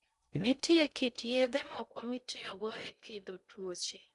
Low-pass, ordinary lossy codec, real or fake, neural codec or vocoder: 10.8 kHz; none; fake; codec, 16 kHz in and 24 kHz out, 0.6 kbps, FocalCodec, streaming, 2048 codes